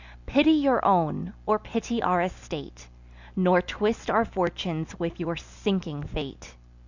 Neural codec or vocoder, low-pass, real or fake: none; 7.2 kHz; real